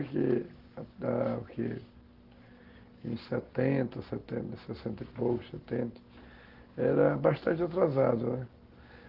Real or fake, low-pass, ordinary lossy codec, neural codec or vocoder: real; 5.4 kHz; Opus, 16 kbps; none